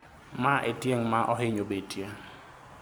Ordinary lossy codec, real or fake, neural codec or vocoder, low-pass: none; real; none; none